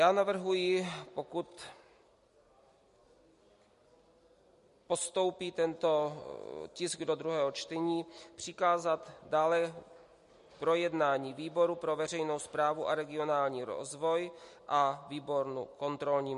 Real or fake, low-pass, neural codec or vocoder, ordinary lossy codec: real; 14.4 kHz; none; MP3, 48 kbps